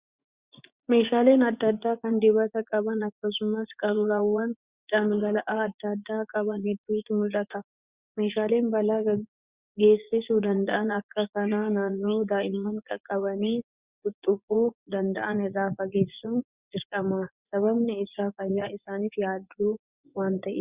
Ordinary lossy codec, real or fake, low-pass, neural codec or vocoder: Opus, 64 kbps; fake; 3.6 kHz; vocoder, 24 kHz, 100 mel bands, Vocos